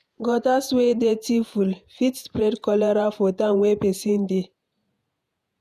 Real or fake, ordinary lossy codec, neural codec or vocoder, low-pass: fake; none; vocoder, 48 kHz, 128 mel bands, Vocos; 14.4 kHz